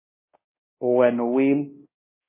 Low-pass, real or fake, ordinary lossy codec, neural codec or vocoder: 3.6 kHz; fake; MP3, 16 kbps; codec, 24 kHz, 0.9 kbps, DualCodec